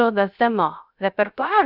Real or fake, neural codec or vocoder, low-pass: fake; codec, 16 kHz, 0.3 kbps, FocalCodec; 5.4 kHz